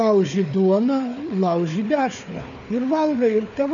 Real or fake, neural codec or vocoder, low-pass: fake; codec, 16 kHz, 4 kbps, FunCodec, trained on Chinese and English, 50 frames a second; 7.2 kHz